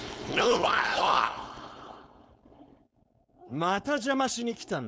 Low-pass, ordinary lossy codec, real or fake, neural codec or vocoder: none; none; fake; codec, 16 kHz, 4.8 kbps, FACodec